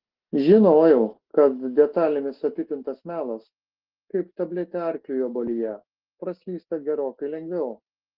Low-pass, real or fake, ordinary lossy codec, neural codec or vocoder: 5.4 kHz; real; Opus, 16 kbps; none